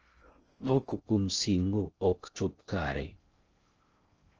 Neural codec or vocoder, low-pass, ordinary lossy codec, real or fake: codec, 16 kHz in and 24 kHz out, 0.6 kbps, FocalCodec, streaming, 4096 codes; 7.2 kHz; Opus, 24 kbps; fake